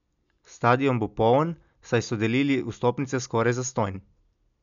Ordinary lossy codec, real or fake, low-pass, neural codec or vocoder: none; real; 7.2 kHz; none